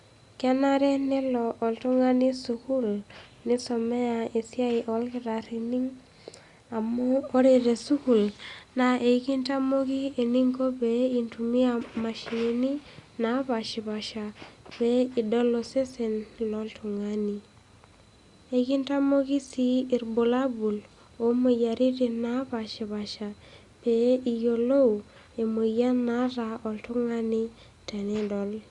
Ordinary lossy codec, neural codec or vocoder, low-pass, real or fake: Opus, 64 kbps; none; 10.8 kHz; real